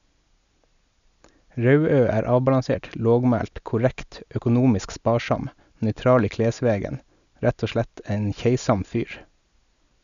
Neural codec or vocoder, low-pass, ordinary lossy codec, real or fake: none; 7.2 kHz; none; real